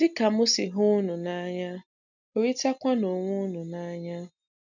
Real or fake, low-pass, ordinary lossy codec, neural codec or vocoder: real; 7.2 kHz; none; none